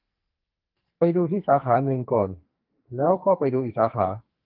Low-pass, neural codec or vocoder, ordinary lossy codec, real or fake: 5.4 kHz; codec, 44.1 kHz, 2.6 kbps, SNAC; Opus, 24 kbps; fake